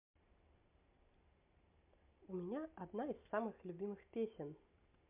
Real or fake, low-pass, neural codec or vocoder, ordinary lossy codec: real; 3.6 kHz; none; MP3, 32 kbps